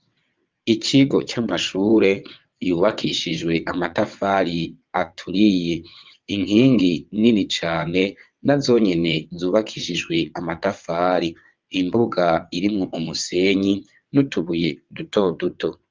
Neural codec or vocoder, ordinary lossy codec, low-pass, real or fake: vocoder, 22.05 kHz, 80 mel bands, WaveNeXt; Opus, 24 kbps; 7.2 kHz; fake